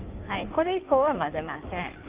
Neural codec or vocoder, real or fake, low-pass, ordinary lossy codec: codec, 16 kHz in and 24 kHz out, 1.1 kbps, FireRedTTS-2 codec; fake; 3.6 kHz; Opus, 32 kbps